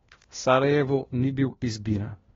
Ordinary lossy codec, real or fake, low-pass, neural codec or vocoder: AAC, 24 kbps; fake; 7.2 kHz; codec, 16 kHz, 1 kbps, FunCodec, trained on LibriTTS, 50 frames a second